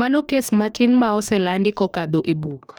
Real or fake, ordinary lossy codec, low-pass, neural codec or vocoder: fake; none; none; codec, 44.1 kHz, 2.6 kbps, DAC